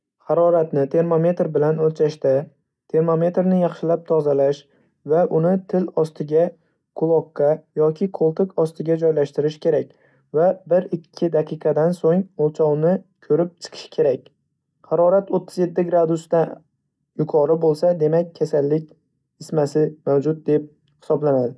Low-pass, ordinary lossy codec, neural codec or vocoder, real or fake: none; none; none; real